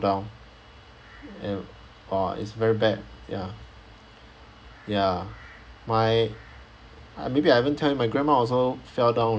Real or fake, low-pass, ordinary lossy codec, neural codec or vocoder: real; none; none; none